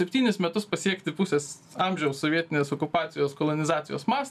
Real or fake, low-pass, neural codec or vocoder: real; 14.4 kHz; none